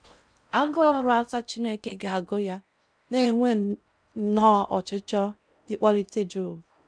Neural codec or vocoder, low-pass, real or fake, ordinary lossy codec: codec, 16 kHz in and 24 kHz out, 0.6 kbps, FocalCodec, streaming, 4096 codes; 9.9 kHz; fake; none